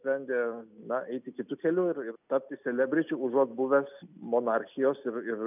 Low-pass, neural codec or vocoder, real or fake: 3.6 kHz; none; real